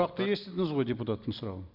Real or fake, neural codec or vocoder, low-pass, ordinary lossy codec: real; none; 5.4 kHz; none